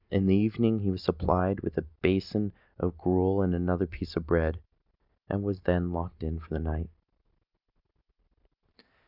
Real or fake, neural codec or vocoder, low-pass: real; none; 5.4 kHz